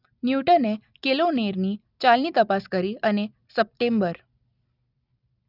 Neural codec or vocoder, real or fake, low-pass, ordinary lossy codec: none; real; 5.4 kHz; none